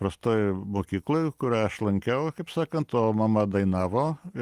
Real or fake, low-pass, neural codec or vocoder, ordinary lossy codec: real; 14.4 kHz; none; Opus, 24 kbps